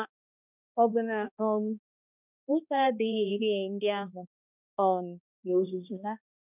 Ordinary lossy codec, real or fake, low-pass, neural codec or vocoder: none; fake; 3.6 kHz; codec, 16 kHz, 1 kbps, X-Codec, HuBERT features, trained on balanced general audio